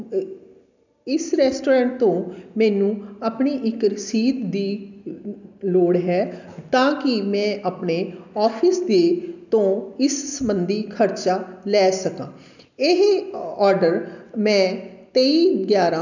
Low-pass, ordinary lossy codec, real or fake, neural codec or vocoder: 7.2 kHz; none; real; none